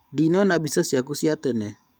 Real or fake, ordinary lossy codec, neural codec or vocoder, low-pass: fake; none; codec, 44.1 kHz, 7.8 kbps, DAC; none